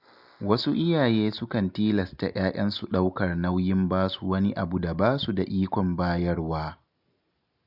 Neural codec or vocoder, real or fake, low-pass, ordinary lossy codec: none; real; 5.4 kHz; none